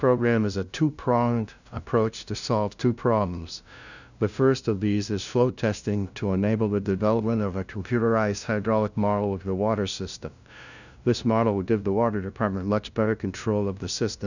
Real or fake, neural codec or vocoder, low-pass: fake; codec, 16 kHz, 0.5 kbps, FunCodec, trained on LibriTTS, 25 frames a second; 7.2 kHz